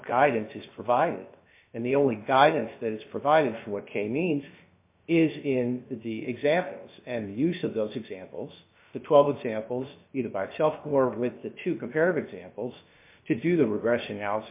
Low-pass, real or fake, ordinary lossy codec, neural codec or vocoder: 3.6 kHz; fake; MP3, 24 kbps; codec, 16 kHz, about 1 kbps, DyCAST, with the encoder's durations